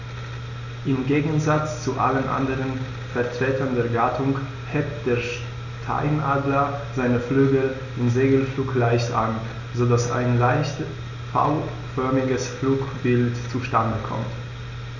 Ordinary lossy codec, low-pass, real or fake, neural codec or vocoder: none; 7.2 kHz; real; none